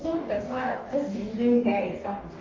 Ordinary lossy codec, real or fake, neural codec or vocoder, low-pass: Opus, 24 kbps; fake; codec, 44.1 kHz, 2.6 kbps, DAC; 7.2 kHz